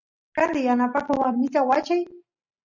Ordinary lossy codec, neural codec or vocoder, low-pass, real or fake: Opus, 64 kbps; none; 7.2 kHz; real